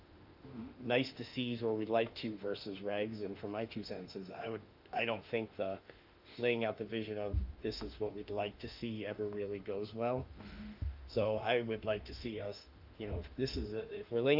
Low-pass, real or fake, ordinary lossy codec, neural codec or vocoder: 5.4 kHz; fake; Opus, 24 kbps; autoencoder, 48 kHz, 32 numbers a frame, DAC-VAE, trained on Japanese speech